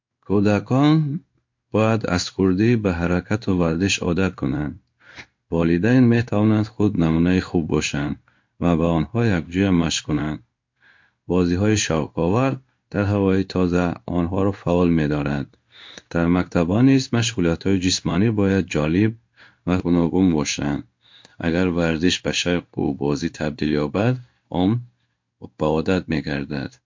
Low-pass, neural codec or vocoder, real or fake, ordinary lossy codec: 7.2 kHz; codec, 16 kHz in and 24 kHz out, 1 kbps, XY-Tokenizer; fake; MP3, 48 kbps